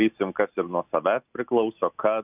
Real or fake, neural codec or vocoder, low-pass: real; none; 3.6 kHz